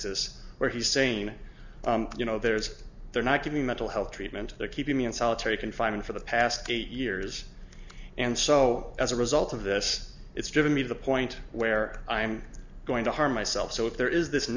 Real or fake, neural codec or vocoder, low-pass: real; none; 7.2 kHz